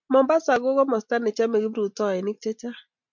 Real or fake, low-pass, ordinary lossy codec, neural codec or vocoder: real; 7.2 kHz; MP3, 64 kbps; none